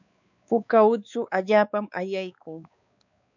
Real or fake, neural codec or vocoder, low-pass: fake; codec, 16 kHz, 4 kbps, X-Codec, WavLM features, trained on Multilingual LibriSpeech; 7.2 kHz